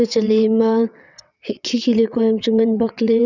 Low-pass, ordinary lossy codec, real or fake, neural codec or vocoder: 7.2 kHz; none; fake; vocoder, 22.05 kHz, 80 mel bands, WaveNeXt